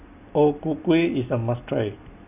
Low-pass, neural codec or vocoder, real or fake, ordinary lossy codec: 3.6 kHz; none; real; none